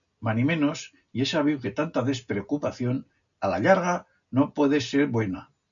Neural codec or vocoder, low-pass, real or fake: none; 7.2 kHz; real